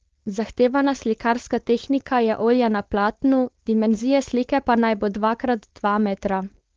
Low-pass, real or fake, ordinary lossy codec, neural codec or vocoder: 7.2 kHz; fake; Opus, 16 kbps; codec, 16 kHz, 4.8 kbps, FACodec